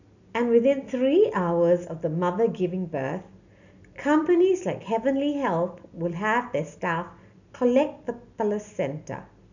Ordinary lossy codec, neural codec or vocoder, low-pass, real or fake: none; none; 7.2 kHz; real